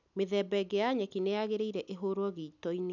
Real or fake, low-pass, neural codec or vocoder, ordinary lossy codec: real; 7.2 kHz; none; none